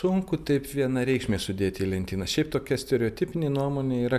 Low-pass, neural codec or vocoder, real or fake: 14.4 kHz; none; real